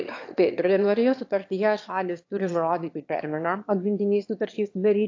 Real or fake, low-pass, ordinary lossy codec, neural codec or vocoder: fake; 7.2 kHz; AAC, 48 kbps; autoencoder, 22.05 kHz, a latent of 192 numbers a frame, VITS, trained on one speaker